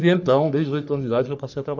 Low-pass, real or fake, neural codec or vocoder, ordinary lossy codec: 7.2 kHz; fake; codec, 44.1 kHz, 3.4 kbps, Pupu-Codec; none